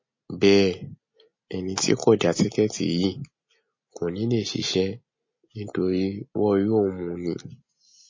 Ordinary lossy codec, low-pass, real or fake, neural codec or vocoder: MP3, 32 kbps; 7.2 kHz; real; none